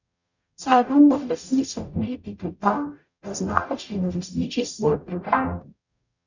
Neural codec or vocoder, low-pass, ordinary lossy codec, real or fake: codec, 44.1 kHz, 0.9 kbps, DAC; 7.2 kHz; none; fake